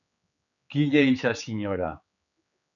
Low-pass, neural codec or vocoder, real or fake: 7.2 kHz; codec, 16 kHz, 4 kbps, X-Codec, HuBERT features, trained on general audio; fake